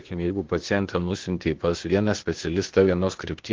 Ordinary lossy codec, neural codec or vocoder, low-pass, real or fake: Opus, 16 kbps; codec, 16 kHz, 0.8 kbps, ZipCodec; 7.2 kHz; fake